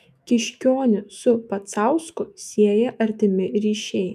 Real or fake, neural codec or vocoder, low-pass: fake; autoencoder, 48 kHz, 128 numbers a frame, DAC-VAE, trained on Japanese speech; 14.4 kHz